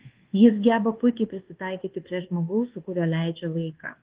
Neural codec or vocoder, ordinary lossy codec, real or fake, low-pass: codec, 24 kHz, 1.2 kbps, DualCodec; Opus, 16 kbps; fake; 3.6 kHz